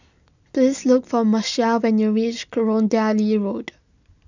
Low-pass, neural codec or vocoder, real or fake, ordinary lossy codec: 7.2 kHz; none; real; none